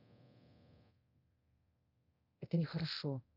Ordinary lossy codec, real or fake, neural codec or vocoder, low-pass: none; fake; codec, 24 kHz, 0.9 kbps, DualCodec; 5.4 kHz